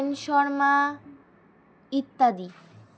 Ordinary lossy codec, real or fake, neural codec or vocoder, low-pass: none; real; none; none